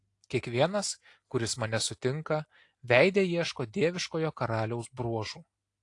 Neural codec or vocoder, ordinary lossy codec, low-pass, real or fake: none; AAC, 48 kbps; 10.8 kHz; real